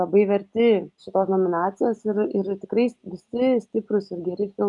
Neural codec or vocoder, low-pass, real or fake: none; 10.8 kHz; real